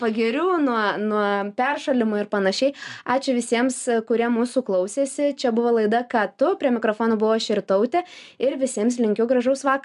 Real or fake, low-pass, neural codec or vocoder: real; 10.8 kHz; none